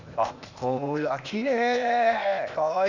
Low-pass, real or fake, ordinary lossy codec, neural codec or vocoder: 7.2 kHz; fake; Opus, 64 kbps; codec, 16 kHz, 0.8 kbps, ZipCodec